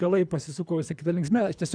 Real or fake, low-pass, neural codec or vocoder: fake; 9.9 kHz; codec, 24 kHz, 3 kbps, HILCodec